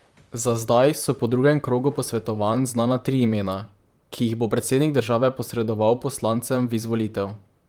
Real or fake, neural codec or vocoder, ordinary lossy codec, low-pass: fake; vocoder, 44.1 kHz, 128 mel bands, Pupu-Vocoder; Opus, 32 kbps; 19.8 kHz